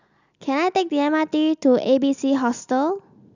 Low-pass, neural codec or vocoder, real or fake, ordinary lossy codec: 7.2 kHz; none; real; none